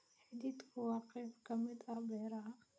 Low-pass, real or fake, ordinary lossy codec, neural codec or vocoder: none; real; none; none